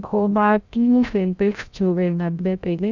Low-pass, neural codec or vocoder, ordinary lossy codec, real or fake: 7.2 kHz; codec, 16 kHz, 0.5 kbps, FreqCodec, larger model; none; fake